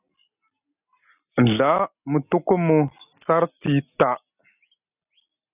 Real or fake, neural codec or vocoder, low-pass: real; none; 3.6 kHz